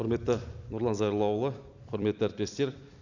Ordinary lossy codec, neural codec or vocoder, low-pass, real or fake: none; vocoder, 44.1 kHz, 128 mel bands every 256 samples, BigVGAN v2; 7.2 kHz; fake